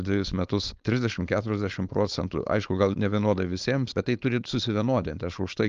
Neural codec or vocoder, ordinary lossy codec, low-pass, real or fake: codec, 16 kHz, 4.8 kbps, FACodec; Opus, 32 kbps; 7.2 kHz; fake